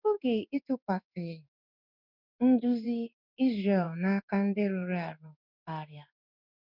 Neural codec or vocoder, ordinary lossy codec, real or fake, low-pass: codec, 16 kHz in and 24 kHz out, 1 kbps, XY-Tokenizer; none; fake; 5.4 kHz